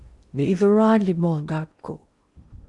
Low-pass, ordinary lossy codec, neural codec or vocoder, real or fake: 10.8 kHz; Opus, 64 kbps; codec, 16 kHz in and 24 kHz out, 0.8 kbps, FocalCodec, streaming, 65536 codes; fake